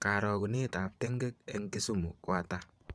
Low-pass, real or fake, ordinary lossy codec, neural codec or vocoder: none; fake; none; vocoder, 22.05 kHz, 80 mel bands, WaveNeXt